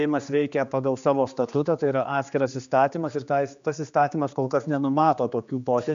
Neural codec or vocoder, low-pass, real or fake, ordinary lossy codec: codec, 16 kHz, 2 kbps, X-Codec, HuBERT features, trained on general audio; 7.2 kHz; fake; MP3, 64 kbps